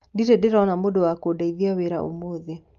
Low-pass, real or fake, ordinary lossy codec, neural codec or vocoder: 7.2 kHz; real; Opus, 24 kbps; none